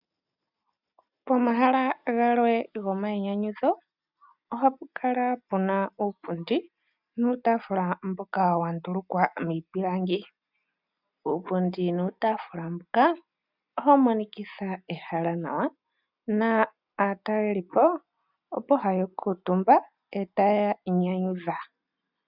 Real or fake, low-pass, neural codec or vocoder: real; 5.4 kHz; none